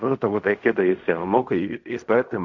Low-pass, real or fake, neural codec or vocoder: 7.2 kHz; fake; codec, 16 kHz in and 24 kHz out, 0.4 kbps, LongCat-Audio-Codec, fine tuned four codebook decoder